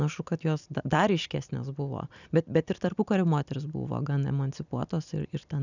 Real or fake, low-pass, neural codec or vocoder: real; 7.2 kHz; none